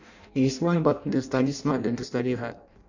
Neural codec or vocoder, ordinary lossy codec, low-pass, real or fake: codec, 16 kHz in and 24 kHz out, 0.6 kbps, FireRedTTS-2 codec; none; 7.2 kHz; fake